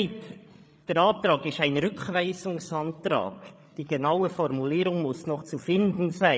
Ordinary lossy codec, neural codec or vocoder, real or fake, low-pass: none; codec, 16 kHz, 8 kbps, FreqCodec, larger model; fake; none